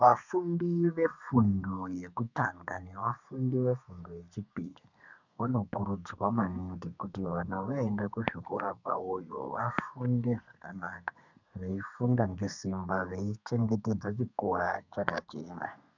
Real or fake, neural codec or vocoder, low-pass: fake; codec, 32 kHz, 1.9 kbps, SNAC; 7.2 kHz